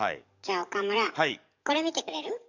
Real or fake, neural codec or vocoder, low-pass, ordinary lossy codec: fake; codec, 44.1 kHz, 7.8 kbps, DAC; 7.2 kHz; none